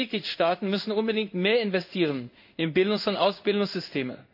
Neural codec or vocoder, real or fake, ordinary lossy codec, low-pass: codec, 16 kHz in and 24 kHz out, 1 kbps, XY-Tokenizer; fake; none; 5.4 kHz